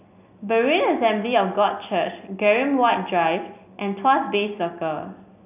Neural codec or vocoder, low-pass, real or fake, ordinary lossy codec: none; 3.6 kHz; real; none